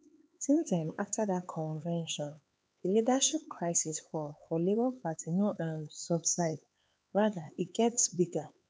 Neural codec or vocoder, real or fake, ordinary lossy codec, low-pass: codec, 16 kHz, 4 kbps, X-Codec, HuBERT features, trained on LibriSpeech; fake; none; none